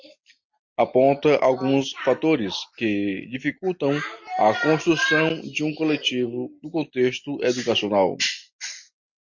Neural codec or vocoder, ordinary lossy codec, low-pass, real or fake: none; MP3, 48 kbps; 7.2 kHz; real